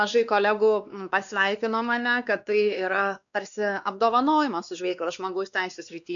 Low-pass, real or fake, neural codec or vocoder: 7.2 kHz; fake; codec, 16 kHz, 2 kbps, X-Codec, WavLM features, trained on Multilingual LibriSpeech